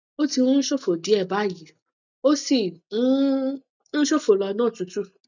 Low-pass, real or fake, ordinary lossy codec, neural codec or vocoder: 7.2 kHz; real; none; none